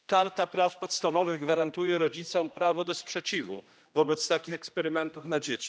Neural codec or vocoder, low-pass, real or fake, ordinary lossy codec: codec, 16 kHz, 1 kbps, X-Codec, HuBERT features, trained on general audio; none; fake; none